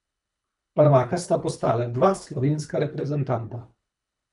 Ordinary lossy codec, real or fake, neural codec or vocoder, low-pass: none; fake; codec, 24 kHz, 3 kbps, HILCodec; 10.8 kHz